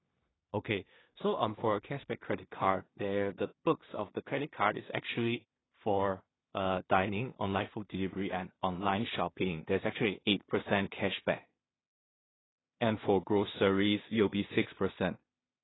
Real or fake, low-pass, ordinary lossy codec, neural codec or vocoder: fake; 7.2 kHz; AAC, 16 kbps; codec, 16 kHz in and 24 kHz out, 0.4 kbps, LongCat-Audio-Codec, two codebook decoder